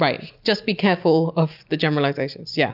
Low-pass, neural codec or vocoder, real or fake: 5.4 kHz; none; real